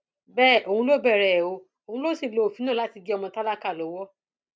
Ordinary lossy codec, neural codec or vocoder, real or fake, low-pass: none; none; real; none